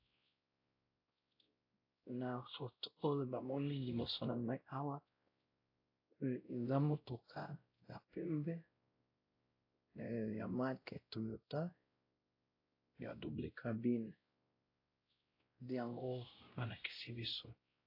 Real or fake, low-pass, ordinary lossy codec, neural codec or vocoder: fake; 5.4 kHz; AAC, 32 kbps; codec, 16 kHz, 0.5 kbps, X-Codec, WavLM features, trained on Multilingual LibriSpeech